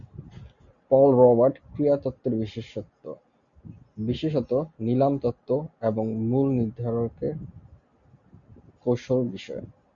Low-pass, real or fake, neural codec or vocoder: 7.2 kHz; real; none